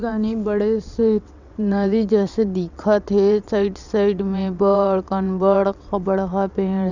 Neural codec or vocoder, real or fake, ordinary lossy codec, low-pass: vocoder, 22.05 kHz, 80 mel bands, WaveNeXt; fake; none; 7.2 kHz